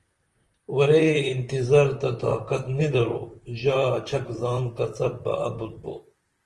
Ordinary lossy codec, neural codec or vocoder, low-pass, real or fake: Opus, 24 kbps; vocoder, 44.1 kHz, 128 mel bands, Pupu-Vocoder; 10.8 kHz; fake